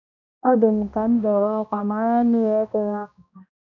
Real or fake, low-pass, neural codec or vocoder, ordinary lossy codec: fake; 7.2 kHz; codec, 16 kHz, 1 kbps, X-Codec, HuBERT features, trained on balanced general audio; none